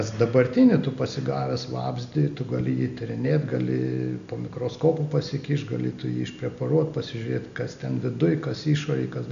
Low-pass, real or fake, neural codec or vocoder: 7.2 kHz; real; none